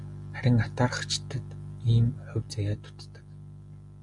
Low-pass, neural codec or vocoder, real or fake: 10.8 kHz; none; real